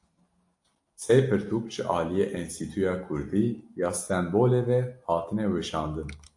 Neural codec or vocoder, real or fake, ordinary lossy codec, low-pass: none; real; AAC, 64 kbps; 10.8 kHz